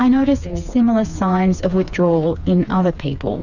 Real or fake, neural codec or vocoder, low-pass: fake; codec, 16 kHz, 4 kbps, FreqCodec, smaller model; 7.2 kHz